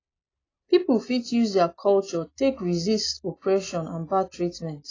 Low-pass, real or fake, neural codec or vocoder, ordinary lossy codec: 7.2 kHz; real; none; AAC, 32 kbps